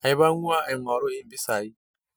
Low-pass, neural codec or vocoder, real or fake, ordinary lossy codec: none; none; real; none